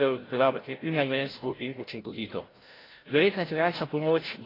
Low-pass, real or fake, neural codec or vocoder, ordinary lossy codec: 5.4 kHz; fake; codec, 16 kHz, 0.5 kbps, FreqCodec, larger model; AAC, 24 kbps